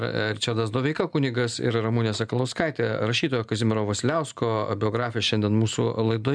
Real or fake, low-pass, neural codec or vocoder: real; 9.9 kHz; none